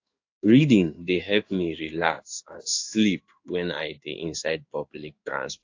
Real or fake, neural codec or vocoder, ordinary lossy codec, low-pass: fake; codec, 16 kHz in and 24 kHz out, 1 kbps, XY-Tokenizer; AAC, 48 kbps; 7.2 kHz